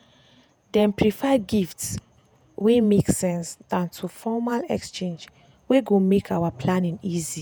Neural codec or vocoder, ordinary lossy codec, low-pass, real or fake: vocoder, 48 kHz, 128 mel bands, Vocos; none; none; fake